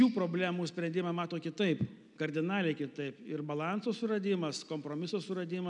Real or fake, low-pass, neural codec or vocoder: real; 10.8 kHz; none